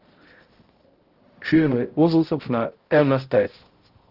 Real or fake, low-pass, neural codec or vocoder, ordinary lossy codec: fake; 5.4 kHz; codec, 16 kHz, 0.5 kbps, X-Codec, HuBERT features, trained on balanced general audio; Opus, 16 kbps